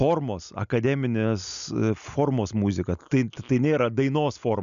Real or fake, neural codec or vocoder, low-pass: real; none; 7.2 kHz